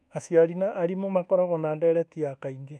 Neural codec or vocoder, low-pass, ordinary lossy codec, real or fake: codec, 24 kHz, 1.2 kbps, DualCodec; none; none; fake